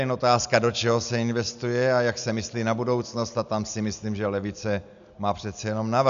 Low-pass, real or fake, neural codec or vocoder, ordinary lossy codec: 7.2 kHz; real; none; AAC, 96 kbps